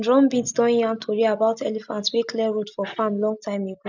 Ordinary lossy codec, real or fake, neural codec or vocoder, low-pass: none; real; none; 7.2 kHz